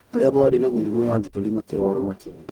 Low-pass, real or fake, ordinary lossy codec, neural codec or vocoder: 19.8 kHz; fake; Opus, 32 kbps; codec, 44.1 kHz, 0.9 kbps, DAC